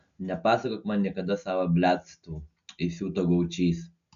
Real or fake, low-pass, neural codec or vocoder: real; 7.2 kHz; none